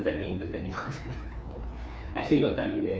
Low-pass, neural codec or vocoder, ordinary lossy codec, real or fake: none; codec, 16 kHz, 2 kbps, FreqCodec, larger model; none; fake